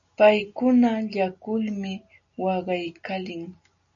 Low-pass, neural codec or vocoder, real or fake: 7.2 kHz; none; real